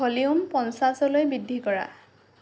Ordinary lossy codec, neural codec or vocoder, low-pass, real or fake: none; none; none; real